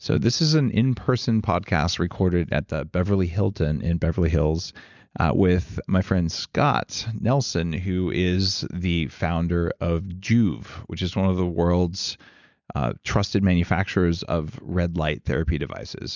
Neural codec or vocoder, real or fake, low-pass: none; real; 7.2 kHz